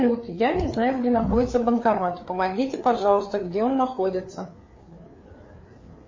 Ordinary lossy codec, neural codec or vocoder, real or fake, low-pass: MP3, 32 kbps; codec, 16 kHz, 4 kbps, FreqCodec, larger model; fake; 7.2 kHz